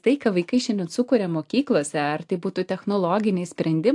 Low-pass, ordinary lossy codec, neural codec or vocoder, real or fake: 10.8 kHz; AAC, 64 kbps; none; real